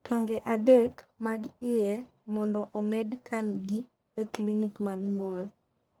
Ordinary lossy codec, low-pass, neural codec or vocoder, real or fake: none; none; codec, 44.1 kHz, 1.7 kbps, Pupu-Codec; fake